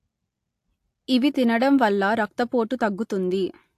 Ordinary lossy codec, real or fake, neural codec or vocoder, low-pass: AAC, 64 kbps; real; none; 14.4 kHz